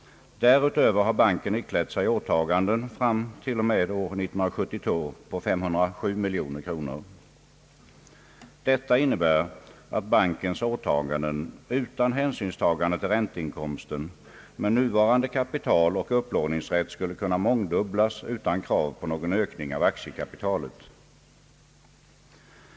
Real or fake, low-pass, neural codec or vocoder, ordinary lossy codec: real; none; none; none